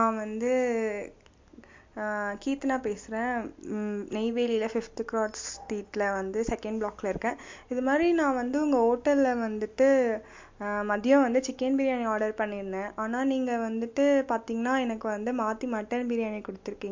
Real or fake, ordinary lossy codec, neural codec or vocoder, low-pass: real; MP3, 48 kbps; none; 7.2 kHz